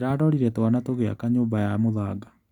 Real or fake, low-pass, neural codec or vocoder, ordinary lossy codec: real; 19.8 kHz; none; none